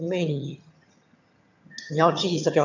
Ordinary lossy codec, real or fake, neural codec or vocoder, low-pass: none; fake; vocoder, 22.05 kHz, 80 mel bands, HiFi-GAN; 7.2 kHz